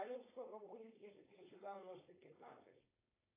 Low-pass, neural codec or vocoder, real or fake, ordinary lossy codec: 3.6 kHz; codec, 16 kHz, 4.8 kbps, FACodec; fake; AAC, 16 kbps